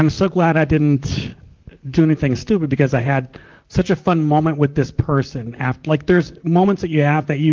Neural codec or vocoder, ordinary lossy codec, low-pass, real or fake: vocoder, 44.1 kHz, 128 mel bands, Pupu-Vocoder; Opus, 32 kbps; 7.2 kHz; fake